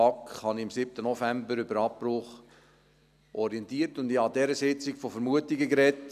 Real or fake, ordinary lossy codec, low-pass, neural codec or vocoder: real; none; 14.4 kHz; none